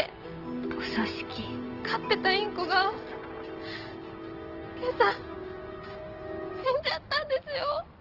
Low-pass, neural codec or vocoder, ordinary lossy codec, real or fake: 5.4 kHz; none; Opus, 16 kbps; real